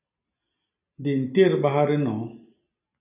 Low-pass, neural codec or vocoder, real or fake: 3.6 kHz; none; real